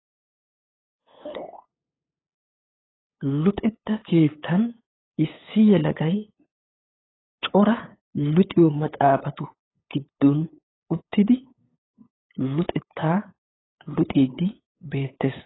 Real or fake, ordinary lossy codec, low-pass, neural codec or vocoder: fake; AAC, 16 kbps; 7.2 kHz; codec, 16 kHz, 8 kbps, FunCodec, trained on LibriTTS, 25 frames a second